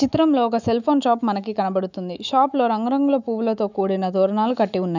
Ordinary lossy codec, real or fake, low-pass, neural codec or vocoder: none; fake; 7.2 kHz; autoencoder, 48 kHz, 128 numbers a frame, DAC-VAE, trained on Japanese speech